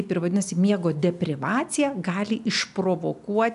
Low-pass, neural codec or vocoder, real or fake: 10.8 kHz; none; real